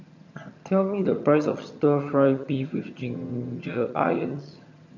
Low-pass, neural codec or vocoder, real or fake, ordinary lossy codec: 7.2 kHz; vocoder, 22.05 kHz, 80 mel bands, HiFi-GAN; fake; none